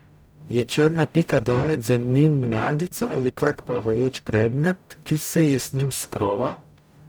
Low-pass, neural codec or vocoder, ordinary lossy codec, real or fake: none; codec, 44.1 kHz, 0.9 kbps, DAC; none; fake